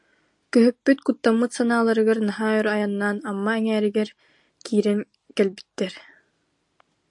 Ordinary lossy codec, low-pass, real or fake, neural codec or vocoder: AAC, 64 kbps; 10.8 kHz; real; none